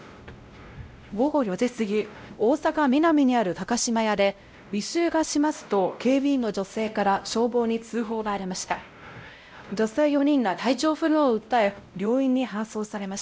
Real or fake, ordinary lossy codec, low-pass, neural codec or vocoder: fake; none; none; codec, 16 kHz, 0.5 kbps, X-Codec, WavLM features, trained on Multilingual LibriSpeech